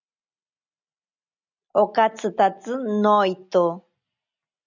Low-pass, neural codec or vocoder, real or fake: 7.2 kHz; none; real